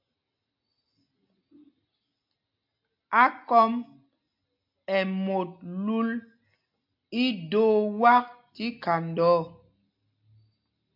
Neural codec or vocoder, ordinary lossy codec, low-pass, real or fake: none; MP3, 48 kbps; 5.4 kHz; real